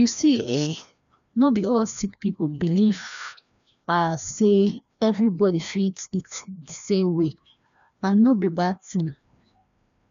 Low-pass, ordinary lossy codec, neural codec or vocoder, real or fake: 7.2 kHz; none; codec, 16 kHz, 1 kbps, FreqCodec, larger model; fake